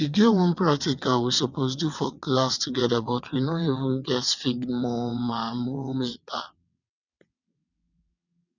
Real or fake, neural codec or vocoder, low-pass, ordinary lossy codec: fake; vocoder, 22.05 kHz, 80 mel bands, WaveNeXt; 7.2 kHz; none